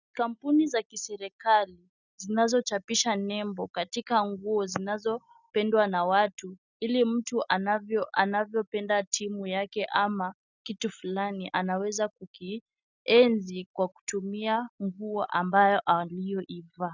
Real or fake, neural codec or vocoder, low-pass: real; none; 7.2 kHz